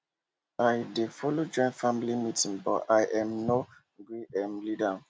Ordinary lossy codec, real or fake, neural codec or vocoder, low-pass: none; real; none; none